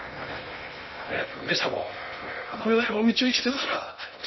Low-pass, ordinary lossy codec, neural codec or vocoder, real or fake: 7.2 kHz; MP3, 24 kbps; codec, 16 kHz in and 24 kHz out, 0.6 kbps, FocalCodec, streaming, 2048 codes; fake